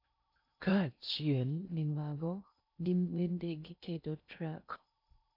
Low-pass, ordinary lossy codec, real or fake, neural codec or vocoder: 5.4 kHz; none; fake; codec, 16 kHz in and 24 kHz out, 0.6 kbps, FocalCodec, streaming, 2048 codes